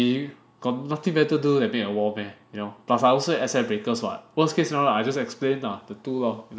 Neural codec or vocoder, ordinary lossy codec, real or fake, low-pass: none; none; real; none